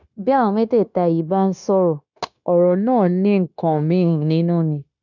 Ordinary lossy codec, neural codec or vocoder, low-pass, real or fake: none; codec, 16 kHz, 0.9 kbps, LongCat-Audio-Codec; 7.2 kHz; fake